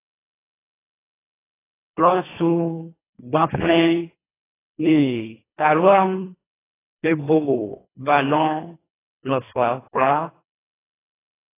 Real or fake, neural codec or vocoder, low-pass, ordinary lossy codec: fake; codec, 24 kHz, 1.5 kbps, HILCodec; 3.6 kHz; AAC, 16 kbps